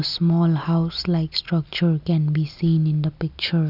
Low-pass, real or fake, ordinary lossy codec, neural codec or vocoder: 5.4 kHz; real; none; none